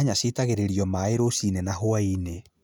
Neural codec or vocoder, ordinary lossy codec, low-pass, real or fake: none; none; none; real